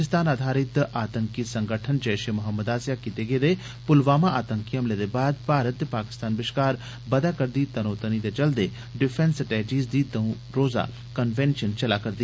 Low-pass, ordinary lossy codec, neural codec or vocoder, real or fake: none; none; none; real